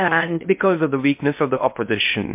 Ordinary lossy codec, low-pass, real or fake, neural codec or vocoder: MP3, 32 kbps; 3.6 kHz; fake; codec, 16 kHz in and 24 kHz out, 0.6 kbps, FocalCodec, streaming, 2048 codes